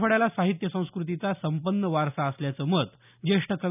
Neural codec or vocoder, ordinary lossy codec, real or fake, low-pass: none; none; real; 3.6 kHz